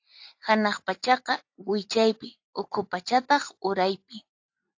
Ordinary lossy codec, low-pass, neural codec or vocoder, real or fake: AAC, 48 kbps; 7.2 kHz; none; real